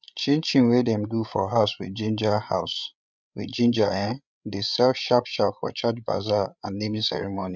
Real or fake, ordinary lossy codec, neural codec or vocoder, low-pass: fake; none; codec, 16 kHz, 8 kbps, FreqCodec, larger model; none